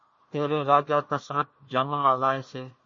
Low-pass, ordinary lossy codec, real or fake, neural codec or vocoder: 7.2 kHz; MP3, 32 kbps; fake; codec, 16 kHz, 1 kbps, FunCodec, trained on Chinese and English, 50 frames a second